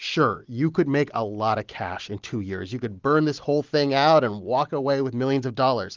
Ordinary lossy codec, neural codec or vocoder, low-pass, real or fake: Opus, 32 kbps; none; 7.2 kHz; real